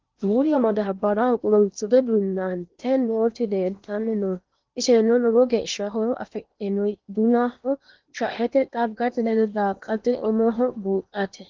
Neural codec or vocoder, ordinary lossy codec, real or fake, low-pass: codec, 16 kHz in and 24 kHz out, 0.8 kbps, FocalCodec, streaming, 65536 codes; Opus, 32 kbps; fake; 7.2 kHz